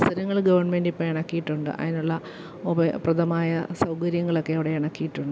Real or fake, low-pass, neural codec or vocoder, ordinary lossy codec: real; none; none; none